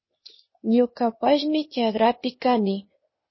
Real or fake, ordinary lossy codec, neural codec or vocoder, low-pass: fake; MP3, 24 kbps; codec, 16 kHz, 0.8 kbps, ZipCodec; 7.2 kHz